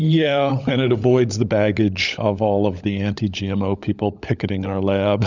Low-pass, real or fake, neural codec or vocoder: 7.2 kHz; fake; codec, 16 kHz, 16 kbps, FunCodec, trained on LibriTTS, 50 frames a second